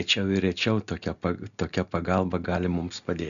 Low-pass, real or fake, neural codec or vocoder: 7.2 kHz; real; none